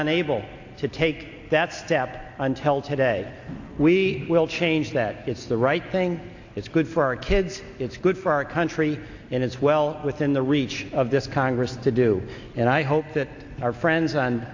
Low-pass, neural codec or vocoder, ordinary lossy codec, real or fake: 7.2 kHz; none; AAC, 48 kbps; real